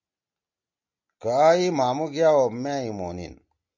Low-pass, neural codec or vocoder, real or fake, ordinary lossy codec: 7.2 kHz; none; real; MP3, 64 kbps